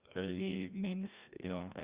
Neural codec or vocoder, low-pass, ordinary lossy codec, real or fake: codec, 16 kHz, 1 kbps, FreqCodec, larger model; 3.6 kHz; Opus, 64 kbps; fake